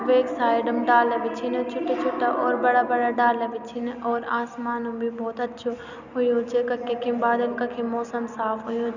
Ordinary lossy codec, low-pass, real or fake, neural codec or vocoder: none; 7.2 kHz; real; none